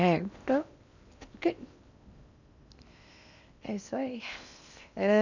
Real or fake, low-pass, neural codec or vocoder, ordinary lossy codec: fake; 7.2 kHz; codec, 16 kHz in and 24 kHz out, 0.8 kbps, FocalCodec, streaming, 65536 codes; none